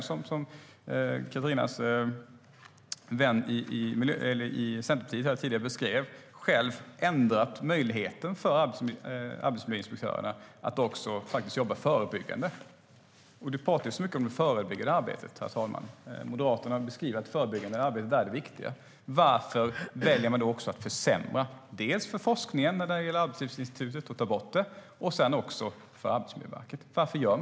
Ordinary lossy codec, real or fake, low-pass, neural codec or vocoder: none; real; none; none